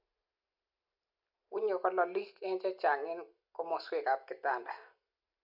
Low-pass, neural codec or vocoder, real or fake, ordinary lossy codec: 5.4 kHz; none; real; MP3, 48 kbps